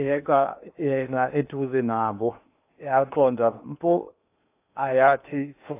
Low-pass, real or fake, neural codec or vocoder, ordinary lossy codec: 3.6 kHz; fake; codec, 16 kHz in and 24 kHz out, 0.8 kbps, FocalCodec, streaming, 65536 codes; AAC, 24 kbps